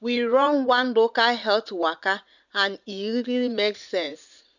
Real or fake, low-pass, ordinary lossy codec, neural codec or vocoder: fake; 7.2 kHz; none; vocoder, 44.1 kHz, 80 mel bands, Vocos